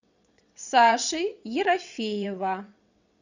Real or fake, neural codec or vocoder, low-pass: fake; vocoder, 22.05 kHz, 80 mel bands, WaveNeXt; 7.2 kHz